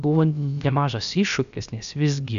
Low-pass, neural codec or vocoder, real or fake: 7.2 kHz; codec, 16 kHz, about 1 kbps, DyCAST, with the encoder's durations; fake